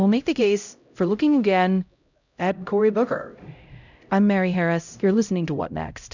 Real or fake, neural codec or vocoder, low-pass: fake; codec, 16 kHz, 0.5 kbps, X-Codec, HuBERT features, trained on LibriSpeech; 7.2 kHz